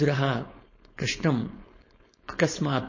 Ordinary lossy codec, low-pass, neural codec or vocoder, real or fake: MP3, 32 kbps; 7.2 kHz; codec, 16 kHz, 4.8 kbps, FACodec; fake